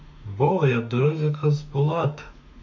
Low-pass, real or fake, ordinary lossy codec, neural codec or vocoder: 7.2 kHz; fake; MP3, 48 kbps; autoencoder, 48 kHz, 32 numbers a frame, DAC-VAE, trained on Japanese speech